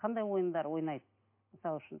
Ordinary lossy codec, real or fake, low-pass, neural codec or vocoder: MP3, 24 kbps; real; 3.6 kHz; none